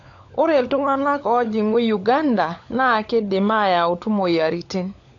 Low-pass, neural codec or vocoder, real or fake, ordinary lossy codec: 7.2 kHz; codec, 16 kHz, 16 kbps, FunCodec, trained on LibriTTS, 50 frames a second; fake; AAC, 48 kbps